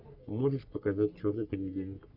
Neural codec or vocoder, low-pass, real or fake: codec, 44.1 kHz, 3.4 kbps, Pupu-Codec; 5.4 kHz; fake